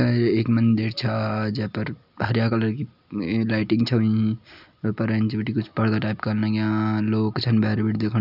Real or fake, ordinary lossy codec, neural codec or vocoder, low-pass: real; none; none; 5.4 kHz